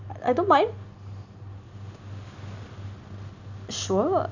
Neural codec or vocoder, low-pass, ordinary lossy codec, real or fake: none; 7.2 kHz; none; real